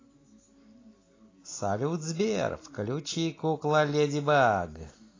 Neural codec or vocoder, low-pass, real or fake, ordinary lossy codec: none; 7.2 kHz; real; AAC, 32 kbps